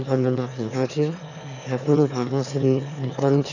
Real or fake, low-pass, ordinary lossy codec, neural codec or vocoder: fake; 7.2 kHz; none; autoencoder, 22.05 kHz, a latent of 192 numbers a frame, VITS, trained on one speaker